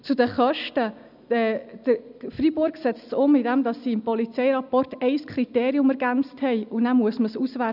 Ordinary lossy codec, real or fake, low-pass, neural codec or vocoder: none; real; 5.4 kHz; none